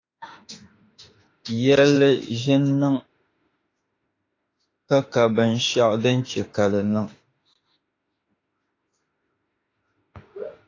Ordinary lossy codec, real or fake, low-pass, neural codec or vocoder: AAC, 32 kbps; fake; 7.2 kHz; autoencoder, 48 kHz, 32 numbers a frame, DAC-VAE, trained on Japanese speech